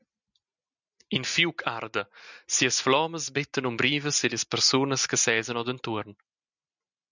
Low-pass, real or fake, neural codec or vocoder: 7.2 kHz; real; none